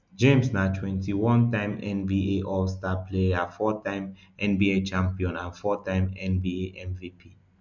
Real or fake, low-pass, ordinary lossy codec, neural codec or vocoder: real; 7.2 kHz; none; none